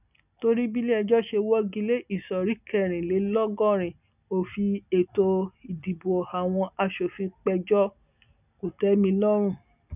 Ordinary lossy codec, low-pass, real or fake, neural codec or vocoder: none; 3.6 kHz; real; none